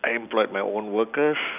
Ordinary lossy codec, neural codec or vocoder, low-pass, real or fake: none; none; 3.6 kHz; real